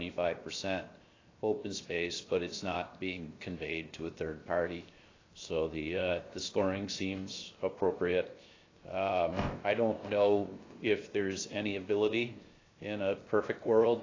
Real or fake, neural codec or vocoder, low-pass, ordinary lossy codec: fake; codec, 16 kHz, 0.7 kbps, FocalCodec; 7.2 kHz; AAC, 32 kbps